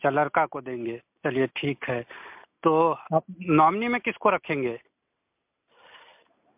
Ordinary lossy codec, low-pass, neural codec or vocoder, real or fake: MP3, 32 kbps; 3.6 kHz; none; real